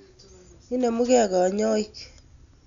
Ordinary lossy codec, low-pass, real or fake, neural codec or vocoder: none; 7.2 kHz; real; none